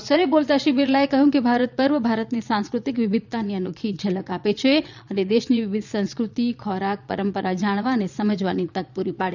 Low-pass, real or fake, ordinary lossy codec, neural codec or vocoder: 7.2 kHz; fake; none; vocoder, 22.05 kHz, 80 mel bands, Vocos